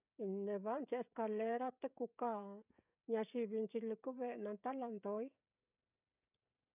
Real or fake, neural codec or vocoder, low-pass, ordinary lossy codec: fake; codec, 16 kHz, 16 kbps, FreqCodec, smaller model; 3.6 kHz; none